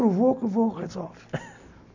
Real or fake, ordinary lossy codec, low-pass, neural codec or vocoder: real; none; 7.2 kHz; none